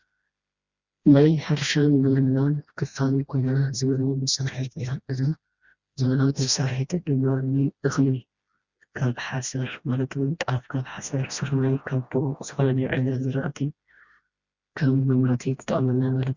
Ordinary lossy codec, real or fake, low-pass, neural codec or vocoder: Opus, 64 kbps; fake; 7.2 kHz; codec, 16 kHz, 1 kbps, FreqCodec, smaller model